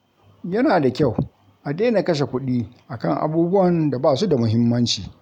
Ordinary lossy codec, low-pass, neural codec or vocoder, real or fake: none; 19.8 kHz; none; real